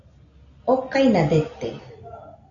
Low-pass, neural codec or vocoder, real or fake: 7.2 kHz; none; real